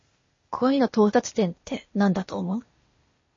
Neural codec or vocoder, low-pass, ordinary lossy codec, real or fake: codec, 16 kHz, 0.8 kbps, ZipCodec; 7.2 kHz; MP3, 32 kbps; fake